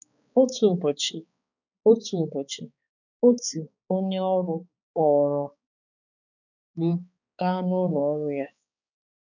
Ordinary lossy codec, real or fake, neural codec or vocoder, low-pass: none; fake; codec, 16 kHz, 4 kbps, X-Codec, HuBERT features, trained on balanced general audio; 7.2 kHz